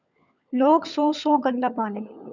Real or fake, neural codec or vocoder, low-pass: fake; codec, 16 kHz, 16 kbps, FunCodec, trained on LibriTTS, 50 frames a second; 7.2 kHz